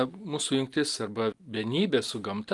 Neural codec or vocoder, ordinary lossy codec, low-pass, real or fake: none; Opus, 32 kbps; 10.8 kHz; real